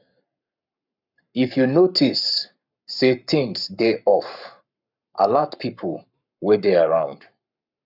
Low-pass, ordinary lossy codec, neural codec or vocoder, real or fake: 5.4 kHz; none; codec, 44.1 kHz, 7.8 kbps, Pupu-Codec; fake